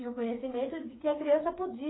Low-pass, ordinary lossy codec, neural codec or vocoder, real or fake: 7.2 kHz; AAC, 16 kbps; codec, 16 kHz, 4 kbps, FreqCodec, smaller model; fake